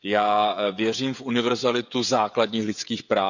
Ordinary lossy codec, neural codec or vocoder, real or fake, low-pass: none; codec, 16 kHz, 16 kbps, FreqCodec, smaller model; fake; 7.2 kHz